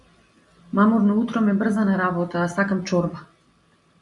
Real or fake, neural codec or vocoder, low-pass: real; none; 10.8 kHz